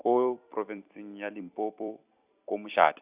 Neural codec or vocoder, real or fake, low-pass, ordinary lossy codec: vocoder, 44.1 kHz, 128 mel bands every 256 samples, BigVGAN v2; fake; 3.6 kHz; Opus, 64 kbps